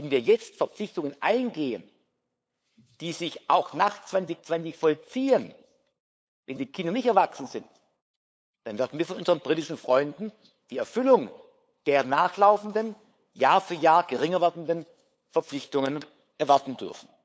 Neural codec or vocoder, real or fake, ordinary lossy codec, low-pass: codec, 16 kHz, 8 kbps, FunCodec, trained on LibriTTS, 25 frames a second; fake; none; none